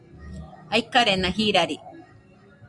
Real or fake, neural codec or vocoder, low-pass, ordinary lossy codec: real; none; 10.8 kHz; Opus, 64 kbps